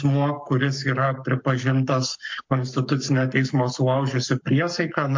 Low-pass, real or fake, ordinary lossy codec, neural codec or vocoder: 7.2 kHz; fake; MP3, 48 kbps; codec, 44.1 kHz, 7.8 kbps, Pupu-Codec